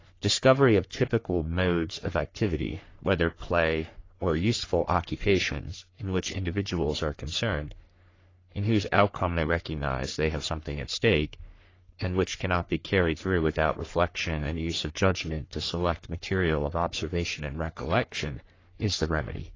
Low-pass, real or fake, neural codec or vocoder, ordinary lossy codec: 7.2 kHz; fake; codec, 44.1 kHz, 3.4 kbps, Pupu-Codec; AAC, 32 kbps